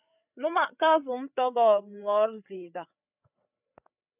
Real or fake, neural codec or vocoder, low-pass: fake; codec, 16 kHz, 8 kbps, FreqCodec, larger model; 3.6 kHz